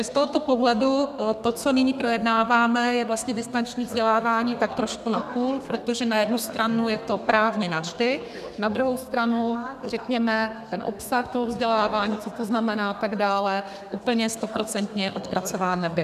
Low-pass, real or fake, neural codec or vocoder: 14.4 kHz; fake; codec, 32 kHz, 1.9 kbps, SNAC